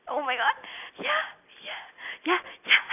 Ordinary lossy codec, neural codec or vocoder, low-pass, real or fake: none; none; 3.6 kHz; real